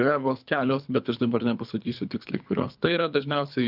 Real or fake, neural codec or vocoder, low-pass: fake; codec, 24 kHz, 3 kbps, HILCodec; 5.4 kHz